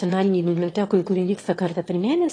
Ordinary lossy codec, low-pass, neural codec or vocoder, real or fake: AAC, 32 kbps; 9.9 kHz; autoencoder, 22.05 kHz, a latent of 192 numbers a frame, VITS, trained on one speaker; fake